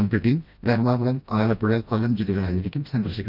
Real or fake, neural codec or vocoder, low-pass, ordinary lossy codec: fake; codec, 16 kHz, 1 kbps, FreqCodec, smaller model; 5.4 kHz; none